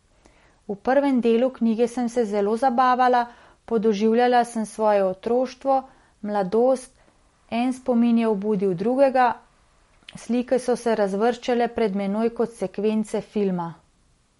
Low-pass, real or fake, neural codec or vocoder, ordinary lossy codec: 19.8 kHz; real; none; MP3, 48 kbps